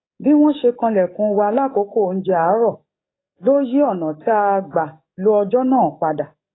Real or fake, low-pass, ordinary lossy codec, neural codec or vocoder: fake; 7.2 kHz; AAC, 16 kbps; codec, 44.1 kHz, 7.8 kbps, DAC